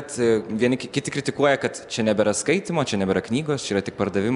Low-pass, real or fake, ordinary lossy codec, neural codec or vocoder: 10.8 kHz; real; MP3, 96 kbps; none